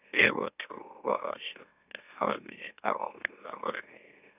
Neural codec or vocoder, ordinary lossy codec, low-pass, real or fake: autoencoder, 44.1 kHz, a latent of 192 numbers a frame, MeloTTS; none; 3.6 kHz; fake